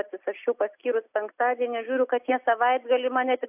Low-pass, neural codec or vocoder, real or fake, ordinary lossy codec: 3.6 kHz; none; real; AAC, 32 kbps